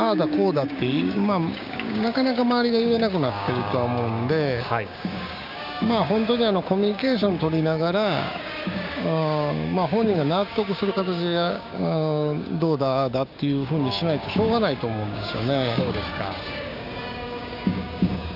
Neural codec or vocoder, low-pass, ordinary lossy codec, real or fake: codec, 16 kHz, 6 kbps, DAC; 5.4 kHz; none; fake